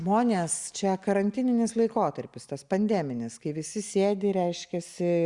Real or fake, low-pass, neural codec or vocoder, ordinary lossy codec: real; 10.8 kHz; none; Opus, 64 kbps